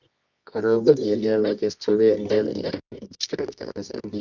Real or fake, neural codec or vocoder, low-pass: fake; codec, 24 kHz, 0.9 kbps, WavTokenizer, medium music audio release; 7.2 kHz